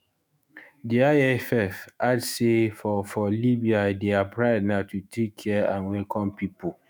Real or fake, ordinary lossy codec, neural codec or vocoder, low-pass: fake; none; autoencoder, 48 kHz, 128 numbers a frame, DAC-VAE, trained on Japanese speech; none